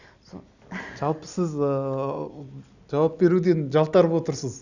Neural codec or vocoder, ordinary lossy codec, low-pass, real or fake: none; none; 7.2 kHz; real